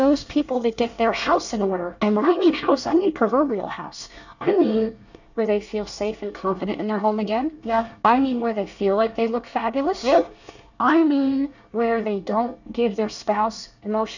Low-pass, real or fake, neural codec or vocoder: 7.2 kHz; fake; codec, 24 kHz, 1 kbps, SNAC